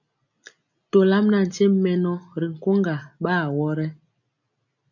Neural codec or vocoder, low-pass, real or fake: none; 7.2 kHz; real